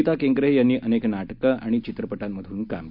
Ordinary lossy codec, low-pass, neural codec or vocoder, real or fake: none; 5.4 kHz; none; real